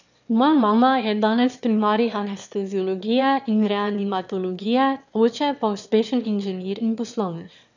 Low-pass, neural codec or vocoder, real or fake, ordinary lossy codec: 7.2 kHz; autoencoder, 22.05 kHz, a latent of 192 numbers a frame, VITS, trained on one speaker; fake; none